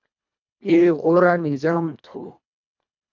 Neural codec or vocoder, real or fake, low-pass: codec, 24 kHz, 1.5 kbps, HILCodec; fake; 7.2 kHz